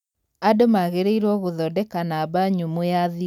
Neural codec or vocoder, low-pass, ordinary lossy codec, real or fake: none; 19.8 kHz; none; real